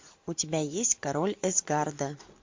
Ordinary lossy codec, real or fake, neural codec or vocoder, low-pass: MP3, 48 kbps; real; none; 7.2 kHz